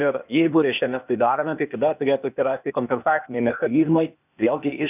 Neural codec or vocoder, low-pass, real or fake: codec, 16 kHz, 0.8 kbps, ZipCodec; 3.6 kHz; fake